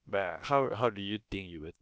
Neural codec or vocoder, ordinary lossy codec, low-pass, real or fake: codec, 16 kHz, about 1 kbps, DyCAST, with the encoder's durations; none; none; fake